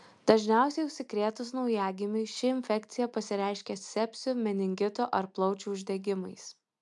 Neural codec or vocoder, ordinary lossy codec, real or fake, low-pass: none; MP3, 96 kbps; real; 10.8 kHz